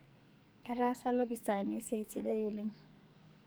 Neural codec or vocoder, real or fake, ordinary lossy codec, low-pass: codec, 44.1 kHz, 2.6 kbps, SNAC; fake; none; none